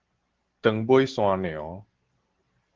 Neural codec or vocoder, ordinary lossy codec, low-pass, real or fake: none; Opus, 16 kbps; 7.2 kHz; real